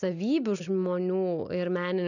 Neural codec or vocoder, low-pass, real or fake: none; 7.2 kHz; real